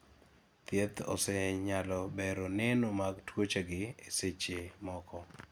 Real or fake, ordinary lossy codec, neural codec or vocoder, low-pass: real; none; none; none